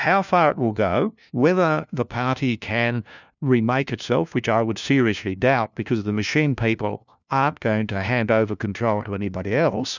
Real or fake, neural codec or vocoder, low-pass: fake; codec, 16 kHz, 1 kbps, FunCodec, trained on LibriTTS, 50 frames a second; 7.2 kHz